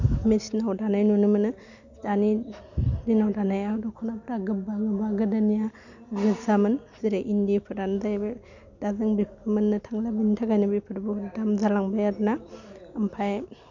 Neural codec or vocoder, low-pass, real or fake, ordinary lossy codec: none; 7.2 kHz; real; none